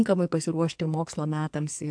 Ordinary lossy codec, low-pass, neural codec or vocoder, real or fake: AAC, 64 kbps; 9.9 kHz; codec, 44.1 kHz, 2.6 kbps, SNAC; fake